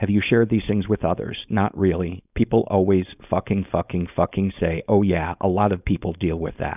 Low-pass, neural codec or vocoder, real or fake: 3.6 kHz; codec, 16 kHz, 4.8 kbps, FACodec; fake